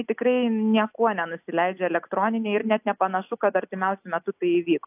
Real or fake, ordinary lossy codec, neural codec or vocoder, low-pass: real; AAC, 32 kbps; none; 3.6 kHz